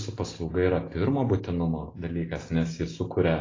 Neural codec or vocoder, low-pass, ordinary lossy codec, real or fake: none; 7.2 kHz; AAC, 32 kbps; real